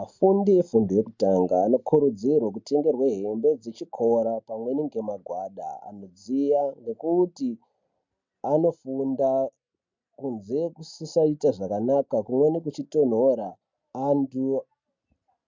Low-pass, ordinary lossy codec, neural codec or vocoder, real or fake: 7.2 kHz; MP3, 64 kbps; none; real